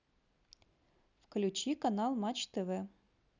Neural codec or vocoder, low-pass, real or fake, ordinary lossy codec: none; 7.2 kHz; real; none